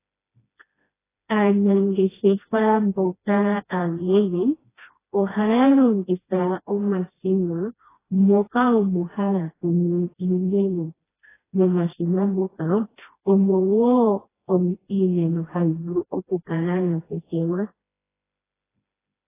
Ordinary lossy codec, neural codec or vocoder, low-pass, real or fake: AAC, 16 kbps; codec, 16 kHz, 1 kbps, FreqCodec, smaller model; 3.6 kHz; fake